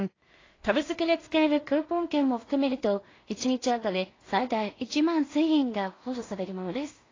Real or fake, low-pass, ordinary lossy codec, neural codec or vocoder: fake; 7.2 kHz; AAC, 32 kbps; codec, 16 kHz in and 24 kHz out, 0.4 kbps, LongCat-Audio-Codec, two codebook decoder